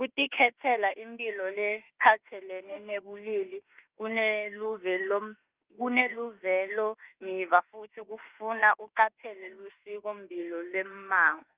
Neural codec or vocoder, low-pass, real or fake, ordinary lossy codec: autoencoder, 48 kHz, 32 numbers a frame, DAC-VAE, trained on Japanese speech; 3.6 kHz; fake; Opus, 24 kbps